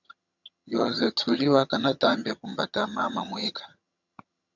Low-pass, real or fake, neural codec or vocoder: 7.2 kHz; fake; vocoder, 22.05 kHz, 80 mel bands, HiFi-GAN